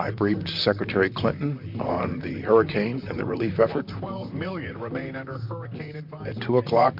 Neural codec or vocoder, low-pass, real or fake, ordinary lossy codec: vocoder, 44.1 kHz, 128 mel bands, Pupu-Vocoder; 5.4 kHz; fake; MP3, 48 kbps